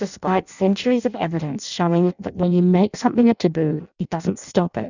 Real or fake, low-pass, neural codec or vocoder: fake; 7.2 kHz; codec, 16 kHz in and 24 kHz out, 0.6 kbps, FireRedTTS-2 codec